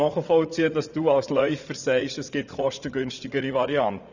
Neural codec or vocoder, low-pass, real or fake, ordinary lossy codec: vocoder, 44.1 kHz, 80 mel bands, Vocos; 7.2 kHz; fake; none